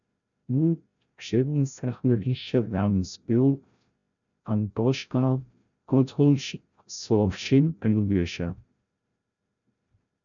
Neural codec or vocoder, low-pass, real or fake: codec, 16 kHz, 0.5 kbps, FreqCodec, larger model; 7.2 kHz; fake